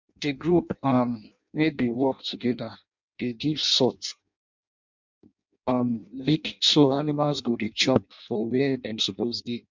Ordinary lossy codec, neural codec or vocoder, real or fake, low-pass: MP3, 64 kbps; codec, 16 kHz in and 24 kHz out, 0.6 kbps, FireRedTTS-2 codec; fake; 7.2 kHz